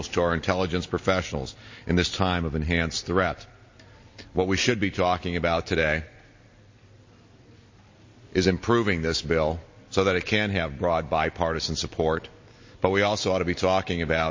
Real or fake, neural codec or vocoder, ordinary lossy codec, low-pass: real; none; MP3, 32 kbps; 7.2 kHz